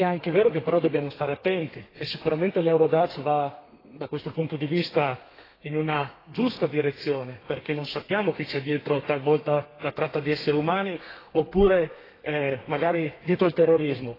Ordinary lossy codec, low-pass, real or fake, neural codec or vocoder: AAC, 24 kbps; 5.4 kHz; fake; codec, 32 kHz, 1.9 kbps, SNAC